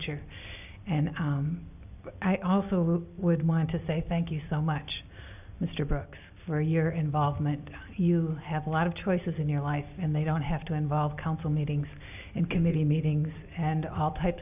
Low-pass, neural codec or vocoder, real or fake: 3.6 kHz; none; real